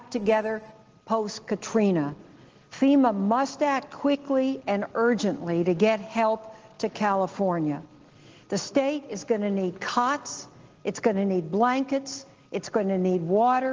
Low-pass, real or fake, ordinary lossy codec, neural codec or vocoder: 7.2 kHz; real; Opus, 16 kbps; none